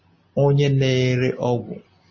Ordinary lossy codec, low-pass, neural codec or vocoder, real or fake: MP3, 32 kbps; 7.2 kHz; none; real